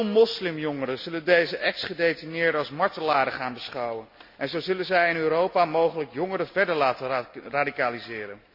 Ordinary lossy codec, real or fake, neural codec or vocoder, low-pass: AAC, 48 kbps; real; none; 5.4 kHz